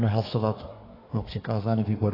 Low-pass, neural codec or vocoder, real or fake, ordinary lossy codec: 5.4 kHz; codec, 24 kHz, 1 kbps, SNAC; fake; AAC, 24 kbps